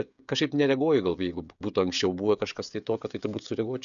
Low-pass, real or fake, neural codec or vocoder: 7.2 kHz; fake; codec, 16 kHz, 16 kbps, FreqCodec, smaller model